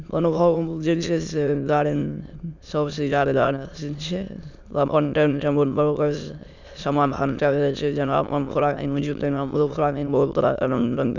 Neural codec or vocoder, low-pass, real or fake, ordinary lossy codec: autoencoder, 22.05 kHz, a latent of 192 numbers a frame, VITS, trained on many speakers; 7.2 kHz; fake; none